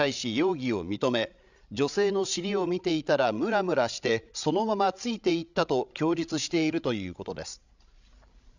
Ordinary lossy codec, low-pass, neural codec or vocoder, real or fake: none; 7.2 kHz; codec, 16 kHz, 16 kbps, FreqCodec, larger model; fake